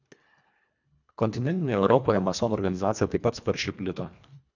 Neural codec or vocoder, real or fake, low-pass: codec, 24 kHz, 1.5 kbps, HILCodec; fake; 7.2 kHz